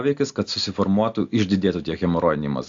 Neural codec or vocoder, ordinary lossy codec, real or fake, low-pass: none; AAC, 64 kbps; real; 7.2 kHz